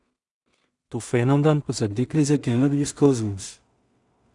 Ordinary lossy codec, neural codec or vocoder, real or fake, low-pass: Opus, 64 kbps; codec, 16 kHz in and 24 kHz out, 0.4 kbps, LongCat-Audio-Codec, two codebook decoder; fake; 10.8 kHz